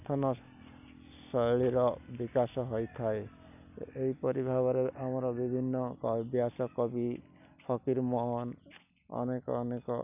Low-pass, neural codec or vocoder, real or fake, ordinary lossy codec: 3.6 kHz; none; real; none